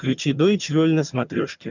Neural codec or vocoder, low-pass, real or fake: vocoder, 22.05 kHz, 80 mel bands, HiFi-GAN; 7.2 kHz; fake